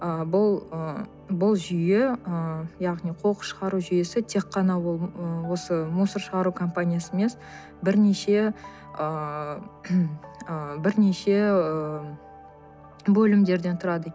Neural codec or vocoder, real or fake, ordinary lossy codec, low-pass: none; real; none; none